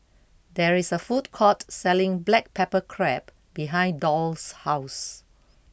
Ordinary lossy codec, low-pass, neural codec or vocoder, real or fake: none; none; none; real